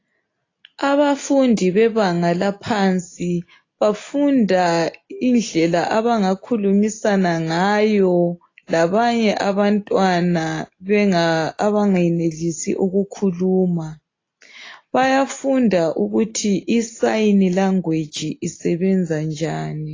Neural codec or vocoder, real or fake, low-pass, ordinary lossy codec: none; real; 7.2 kHz; AAC, 32 kbps